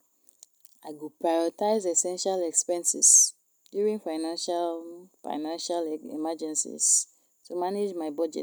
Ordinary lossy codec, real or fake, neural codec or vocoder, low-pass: none; real; none; none